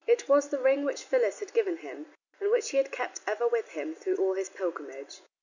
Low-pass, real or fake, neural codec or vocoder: 7.2 kHz; real; none